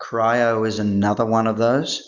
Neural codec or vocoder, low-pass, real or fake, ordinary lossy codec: none; 7.2 kHz; real; Opus, 64 kbps